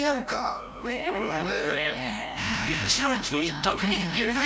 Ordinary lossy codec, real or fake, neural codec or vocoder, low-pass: none; fake; codec, 16 kHz, 0.5 kbps, FreqCodec, larger model; none